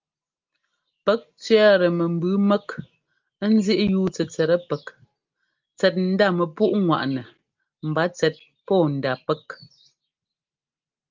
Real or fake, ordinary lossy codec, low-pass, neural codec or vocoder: real; Opus, 24 kbps; 7.2 kHz; none